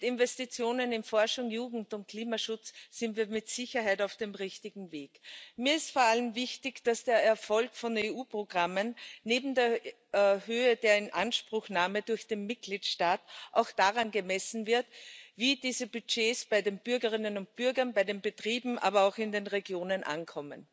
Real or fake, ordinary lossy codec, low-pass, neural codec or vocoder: real; none; none; none